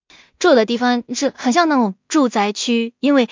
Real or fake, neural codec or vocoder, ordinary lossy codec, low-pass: fake; codec, 16 kHz in and 24 kHz out, 0.4 kbps, LongCat-Audio-Codec, two codebook decoder; MP3, 48 kbps; 7.2 kHz